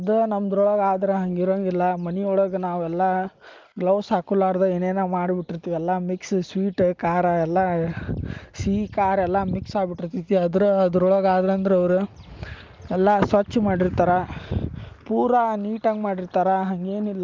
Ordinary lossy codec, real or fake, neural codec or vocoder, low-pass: Opus, 24 kbps; real; none; 7.2 kHz